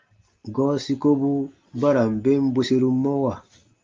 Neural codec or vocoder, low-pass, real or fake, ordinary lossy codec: none; 7.2 kHz; real; Opus, 32 kbps